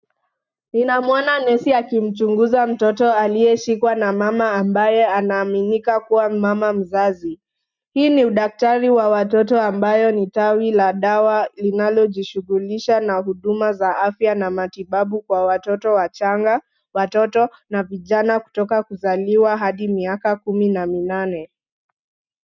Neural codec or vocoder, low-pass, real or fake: none; 7.2 kHz; real